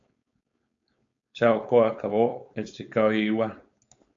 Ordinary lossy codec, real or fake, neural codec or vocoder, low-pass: AAC, 64 kbps; fake; codec, 16 kHz, 4.8 kbps, FACodec; 7.2 kHz